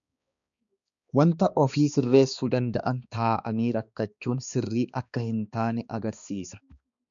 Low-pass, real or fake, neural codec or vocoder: 7.2 kHz; fake; codec, 16 kHz, 2 kbps, X-Codec, HuBERT features, trained on balanced general audio